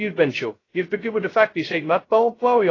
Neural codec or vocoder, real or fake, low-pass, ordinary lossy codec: codec, 16 kHz, 0.2 kbps, FocalCodec; fake; 7.2 kHz; AAC, 32 kbps